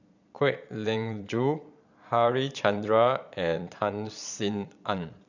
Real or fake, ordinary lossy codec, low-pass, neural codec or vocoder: fake; none; 7.2 kHz; vocoder, 22.05 kHz, 80 mel bands, Vocos